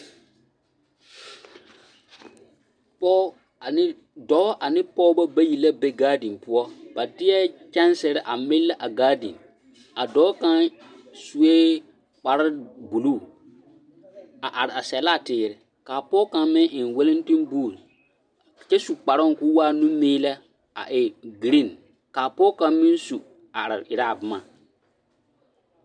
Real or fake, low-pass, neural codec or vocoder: real; 9.9 kHz; none